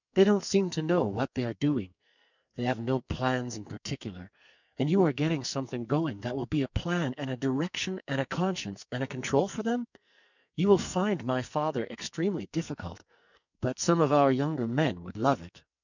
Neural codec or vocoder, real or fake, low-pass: codec, 44.1 kHz, 2.6 kbps, SNAC; fake; 7.2 kHz